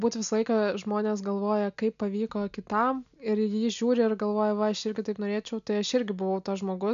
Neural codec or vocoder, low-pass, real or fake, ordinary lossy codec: none; 7.2 kHz; real; AAC, 96 kbps